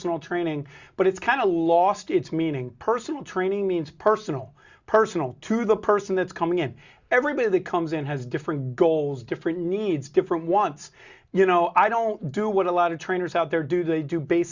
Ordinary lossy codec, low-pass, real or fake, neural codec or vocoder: Opus, 64 kbps; 7.2 kHz; real; none